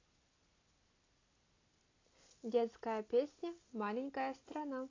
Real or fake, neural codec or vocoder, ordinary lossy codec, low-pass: real; none; AAC, 32 kbps; 7.2 kHz